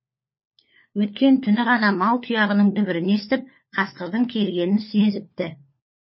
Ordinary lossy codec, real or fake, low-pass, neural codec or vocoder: MP3, 24 kbps; fake; 7.2 kHz; codec, 16 kHz, 4 kbps, FunCodec, trained on LibriTTS, 50 frames a second